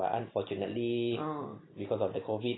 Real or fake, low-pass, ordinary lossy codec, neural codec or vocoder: real; 7.2 kHz; AAC, 16 kbps; none